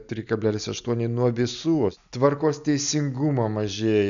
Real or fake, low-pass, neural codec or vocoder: real; 7.2 kHz; none